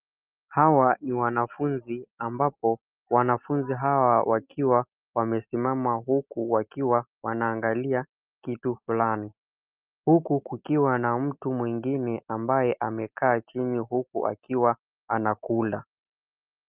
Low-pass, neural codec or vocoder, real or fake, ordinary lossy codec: 3.6 kHz; none; real; Opus, 32 kbps